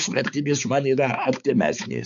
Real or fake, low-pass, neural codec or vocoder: fake; 7.2 kHz; codec, 16 kHz, 4 kbps, X-Codec, HuBERT features, trained on balanced general audio